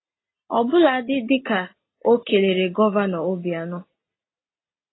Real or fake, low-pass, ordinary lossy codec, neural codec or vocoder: real; 7.2 kHz; AAC, 16 kbps; none